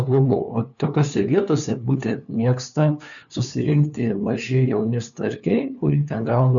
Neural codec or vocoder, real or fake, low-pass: codec, 16 kHz, 2 kbps, FunCodec, trained on LibriTTS, 25 frames a second; fake; 7.2 kHz